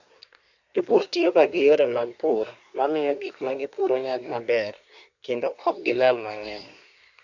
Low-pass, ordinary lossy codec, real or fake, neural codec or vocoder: 7.2 kHz; none; fake; codec, 24 kHz, 1 kbps, SNAC